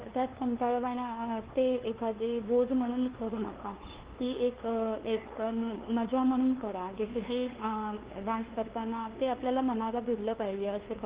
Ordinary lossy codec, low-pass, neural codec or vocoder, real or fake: Opus, 16 kbps; 3.6 kHz; codec, 16 kHz, 2 kbps, FunCodec, trained on LibriTTS, 25 frames a second; fake